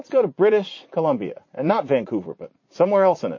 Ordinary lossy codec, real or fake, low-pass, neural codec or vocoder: MP3, 32 kbps; fake; 7.2 kHz; vocoder, 44.1 kHz, 80 mel bands, Vocos